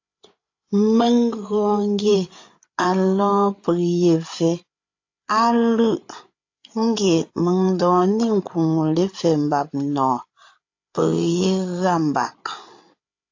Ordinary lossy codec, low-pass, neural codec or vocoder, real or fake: AAC, 48 kbps; 7.2 kHz; codec, 16 kHz, 8 kbps, FreqCodec, larger model; fake